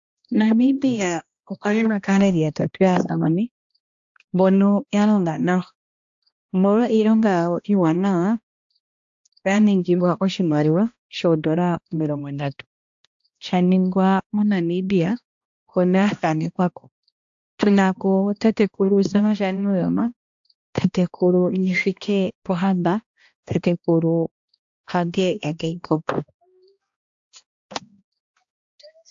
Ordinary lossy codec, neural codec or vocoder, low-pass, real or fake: AAC, 64 kbps; codec, 16 kHz, 1 kbps, X-Codec, HuBERT features, trained on balanced general audio; 7.2 kHz; fake